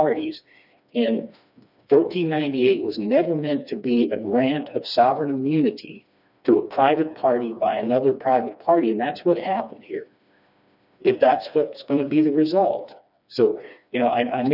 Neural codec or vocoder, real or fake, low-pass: codec, 16 kHz, 2 kbps, FreqCodec, smaller model; fake; 5.4 kHz